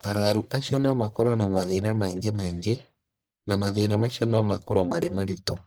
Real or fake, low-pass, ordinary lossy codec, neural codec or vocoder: fake; none; none; codec, 44.1 kHz, 1.7 kbps, Pupu-Codec